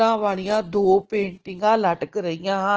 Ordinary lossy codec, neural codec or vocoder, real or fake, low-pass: Opus, 16 kbps; none; real; 7.2 kHz